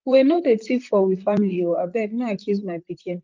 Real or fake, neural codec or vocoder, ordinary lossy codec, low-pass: fake; vocoder, 44.1 kHz, 128 mel bands, Pupu-Vocoder; Opus, 24 kbps; 7.2 kHz